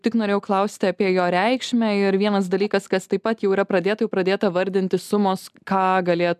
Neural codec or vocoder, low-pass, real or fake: none; 14.4 kHz; real